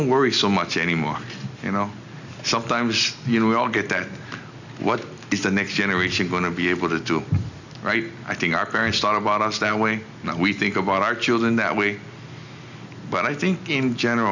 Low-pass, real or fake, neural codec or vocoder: 7.2 kHz; real; none